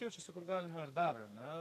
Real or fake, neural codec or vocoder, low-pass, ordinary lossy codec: fake; codec, 32 kHz, 1.9 kbps, SNAC; 14.4 kHz; AAC, 64 kbps